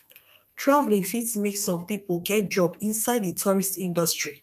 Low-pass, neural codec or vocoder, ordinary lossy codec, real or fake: 14.4 kHz; codec, 32 kHz, 1.9 kbps, SNAC; none; fake